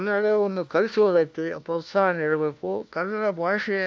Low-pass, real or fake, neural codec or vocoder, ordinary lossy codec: none; fake; codec, 16 kHz, 1 kbps, FunCodec, trained on LibriTTS, 50 frames a second; none